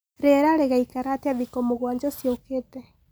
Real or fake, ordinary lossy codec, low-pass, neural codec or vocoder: real; none; none; none